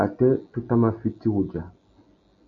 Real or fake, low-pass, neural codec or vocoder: real; 7.2 kHz; none